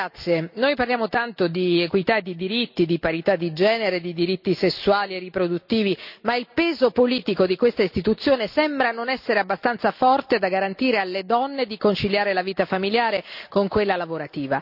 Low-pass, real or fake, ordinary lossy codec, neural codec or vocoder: 5.4 kHz; real; none; none